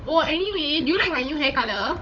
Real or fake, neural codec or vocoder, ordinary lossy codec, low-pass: fake; codec, 16 kHz, 16 kbps, FunCodec, trained on Chinese and English, 50 frames a second; MP3, 64 kbps; 7.2 kHz